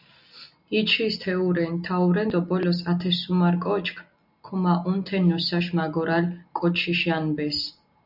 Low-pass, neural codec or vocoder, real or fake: 5.4 kHz; none; real